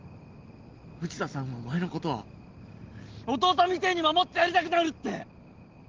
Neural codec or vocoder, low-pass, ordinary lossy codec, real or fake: none; 7.2 kHz; Opus, 16 kbps; real